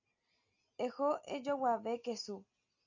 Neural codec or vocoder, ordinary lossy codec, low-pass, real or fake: none; AAC, 48 kbps; 7.2 kHz; real